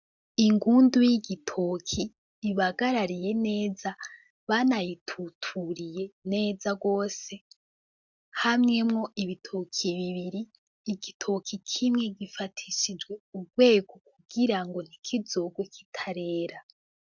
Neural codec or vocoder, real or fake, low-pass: none; real; 7.2 kHz